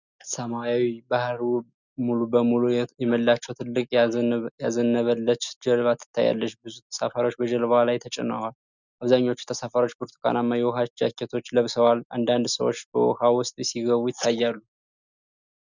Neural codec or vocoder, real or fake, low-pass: none; real; 7.2 kHz